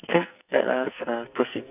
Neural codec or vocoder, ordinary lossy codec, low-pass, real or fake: codec, 44.1 kHz, 2.6 kbps, SNAC; none; 3.6 kHz; fake